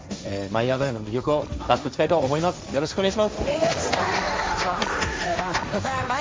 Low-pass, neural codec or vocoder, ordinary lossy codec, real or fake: none; codec, 16 kHz, 1.1 kbps, Voila-Tokenizer; none; fake